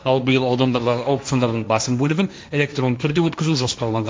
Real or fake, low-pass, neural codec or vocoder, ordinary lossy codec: fake; none; codec, 16 kHz, 1.1 kbps, Voila-Tokenizer; none